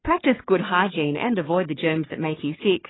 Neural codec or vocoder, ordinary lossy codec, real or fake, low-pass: codec, 44.1 kHz, 3.4 kbps, Pupu-Codec; AAC, 16 kbps; fake; 7.2 kHz